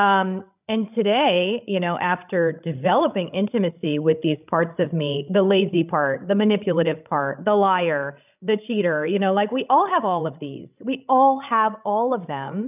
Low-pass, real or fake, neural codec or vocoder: 3.6 kHz; fake; codec, 16 kHz, 16 kbps, FreqCodec, larger model